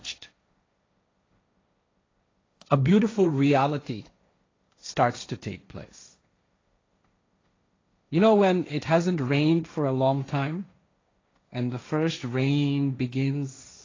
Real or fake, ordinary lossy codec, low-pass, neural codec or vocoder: fake; AAC, 32 kbps; 7.2 kHz; codec, 16 kHz, 1.1 kbps, Voila-Tokenizer